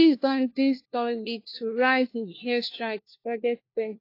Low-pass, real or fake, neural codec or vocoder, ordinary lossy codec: 5.4 kHz; fake; codec, 16 kHz, 1 kbps, FunCodec, trained on LibriTTS, 50 frames a second; AAC, 32 kbps